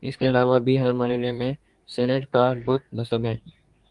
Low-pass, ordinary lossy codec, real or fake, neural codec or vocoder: 10.8 kHz; Opus, 32 kbps; fake; codec, 24 kHz, 1 kbps, SNAC